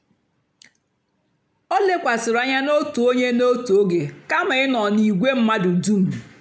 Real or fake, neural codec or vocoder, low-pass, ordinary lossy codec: real; none; none; none